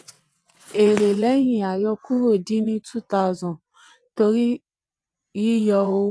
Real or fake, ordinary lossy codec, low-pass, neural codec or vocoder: fake; none; none; vocoder, 22.05 kHz, 80 mel bands, WaveNeXt